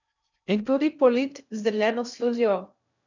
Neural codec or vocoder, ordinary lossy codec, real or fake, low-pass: codec, 16 kHz in and 24 kHz out, 0.6 kbps, FocalCodec, streaming, 2048 codes; none; fake; 7.2 kHz